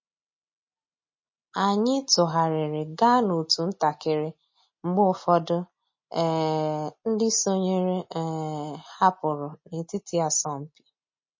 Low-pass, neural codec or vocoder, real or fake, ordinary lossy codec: 7.2 kHz; none; real; MP3, 32 kbps